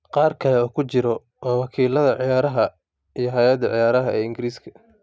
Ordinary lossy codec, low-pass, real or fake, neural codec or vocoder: none; none; real; none